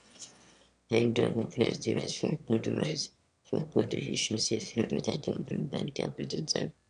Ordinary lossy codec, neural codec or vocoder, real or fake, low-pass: none; autoencoder, 22.05 kHz, a latent of 192 numbers a frame, VITS, trained on one speaker; fake; 9.9 kHz